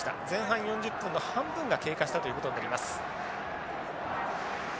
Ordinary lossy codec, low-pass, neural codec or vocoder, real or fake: none; none; none; real